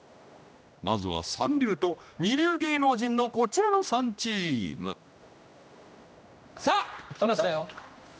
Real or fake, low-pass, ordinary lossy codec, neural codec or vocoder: fake; none; none; codec, 16 kHz, 1 kbps, X-Codec, HuBERT features, trained on general audio